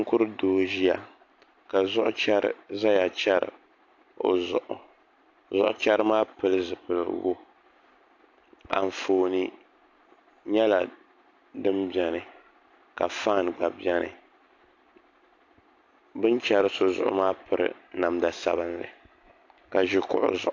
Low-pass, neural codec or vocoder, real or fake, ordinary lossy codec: 7.2 kHz; none; real; AAC, 48 kbps